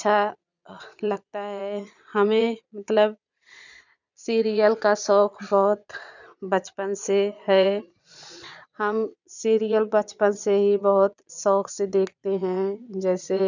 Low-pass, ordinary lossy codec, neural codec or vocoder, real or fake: 7.2 kHz; none; vocoder, 22.05 kHz, 80 mel bands, Vocos; fake